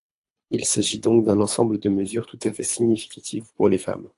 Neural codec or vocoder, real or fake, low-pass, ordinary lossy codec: codec, 24 kHz, 3 kbps, HILCodec; fake; 10.8 kHz; AAC, 64 kbps